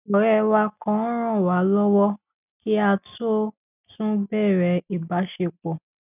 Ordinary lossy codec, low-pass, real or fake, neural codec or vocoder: none; 3.6 kHz; real; none